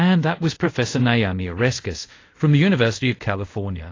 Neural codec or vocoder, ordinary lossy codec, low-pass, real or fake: codec, 24 kHz, 0.5 kbps, DualCodec; AAC, 32 kbps; 7.2 kHz; fake